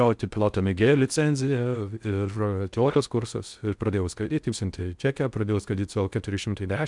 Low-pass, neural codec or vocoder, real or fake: 10.8 kHz; codec, 16 kHz in and 24 kHz out, 0.6 kbps, FocalCodec, streaming, 4096 codes; fake